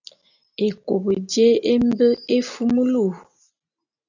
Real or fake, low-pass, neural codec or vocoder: real; 7.2 kHz; none